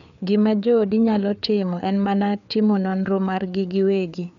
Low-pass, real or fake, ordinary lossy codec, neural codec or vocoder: 7.2 kHz; fake; none; codec, 16 kHz, 4 kbps, FreqCodec, larger model